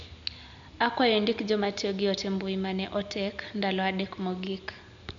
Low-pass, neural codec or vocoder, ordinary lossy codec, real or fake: 7.2 kHz; none; MP3, 64 kbps; real